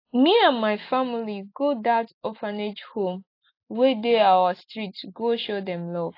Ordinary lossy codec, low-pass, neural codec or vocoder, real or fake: AAC, 32 kbps; 5.4 kHz; none; real